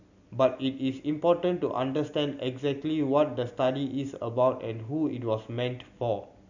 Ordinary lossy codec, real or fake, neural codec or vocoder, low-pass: none; real; none; 7.2 kHz